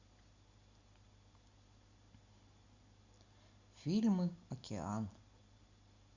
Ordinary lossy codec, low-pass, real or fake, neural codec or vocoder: Opus, 64 kbps; 7.2 kHz; real; none